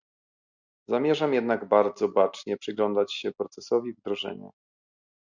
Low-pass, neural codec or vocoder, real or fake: 7.2 kHz; none; real